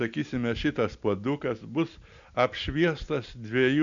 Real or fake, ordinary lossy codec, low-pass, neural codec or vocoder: real; MP3, 64 kbps; 7.2 kHz; none